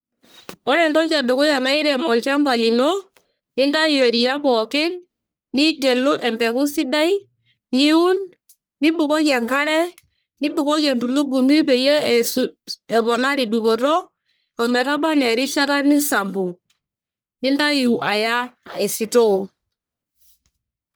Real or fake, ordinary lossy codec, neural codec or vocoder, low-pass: fake; none; codec, 44.1 kHz, 1.7 kbps, Pupu-Codec; none